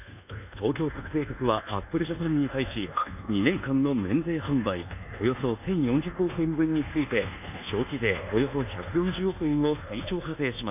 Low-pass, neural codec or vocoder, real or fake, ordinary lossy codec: 3.6 kHz; codec, 24 kHz, 1.2 kbps, DualCodec; fake; none